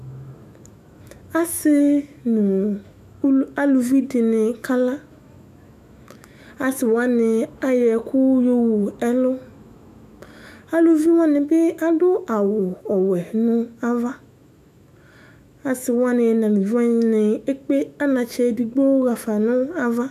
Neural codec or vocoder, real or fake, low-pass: autoencoder, 48 kHz, 128 numbers a frame, DAC-VAE, trained on Japanese speech; fake; 14.4 kHz